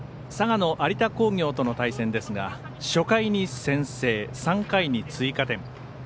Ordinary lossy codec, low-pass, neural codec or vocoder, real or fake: none; none; none; real